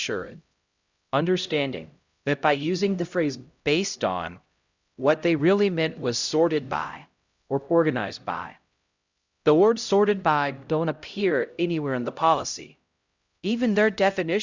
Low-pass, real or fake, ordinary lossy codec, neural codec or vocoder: 7.2 kHz; fake; Opus, 64 kbps; codec, 16 kHz, 0.5 kbps, X-Codec, HuBERT features, trained on LibriSpeech